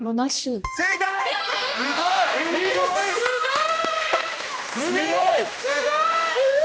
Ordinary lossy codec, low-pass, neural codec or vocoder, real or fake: none; none; codec, 16 kHz, 1 kbps, X-Codec, HuBERT features, trained on general audio; fake